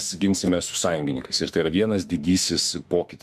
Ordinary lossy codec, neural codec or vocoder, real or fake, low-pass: MP3, 96 kbps; autoencoder, 48 kHz, 32 numbers a frame, DAC-VAE, trained on Japanese speech; fake; 14.4 kHz